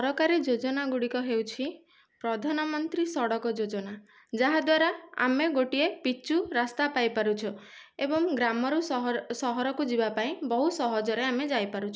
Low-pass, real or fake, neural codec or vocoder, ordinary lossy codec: none; real; none; none